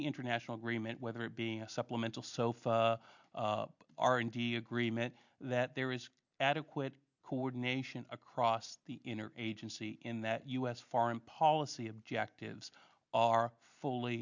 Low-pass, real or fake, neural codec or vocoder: 7.2 kHz; real; none